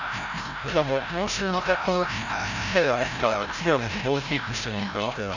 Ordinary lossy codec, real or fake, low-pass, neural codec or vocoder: none; fake; 7.2 kHz; codec, 16 kHz, 0.5 kbps, FreqCodec, larger model